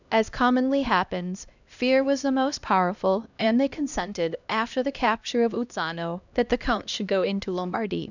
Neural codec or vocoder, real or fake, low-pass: codec, 16 kHz, 1 kbps, X-Codec, HuBERT features, trained on LibriSpeech; fake; 7.2 kHz